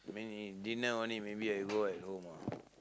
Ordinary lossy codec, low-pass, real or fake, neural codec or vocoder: none; none; real; none